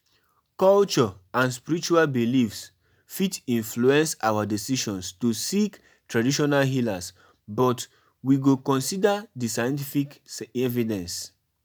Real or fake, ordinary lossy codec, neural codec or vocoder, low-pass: real; none; none; none